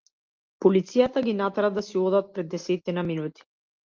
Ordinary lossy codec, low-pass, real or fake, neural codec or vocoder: Opus, 32 kbps; 7.2 kHz; real; none